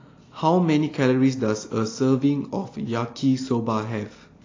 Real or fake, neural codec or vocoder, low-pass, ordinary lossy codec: real; none; 7.2 kHz; AAC, 32 kbps